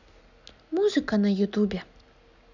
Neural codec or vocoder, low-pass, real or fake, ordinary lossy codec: none; 7.2 kHz; real; none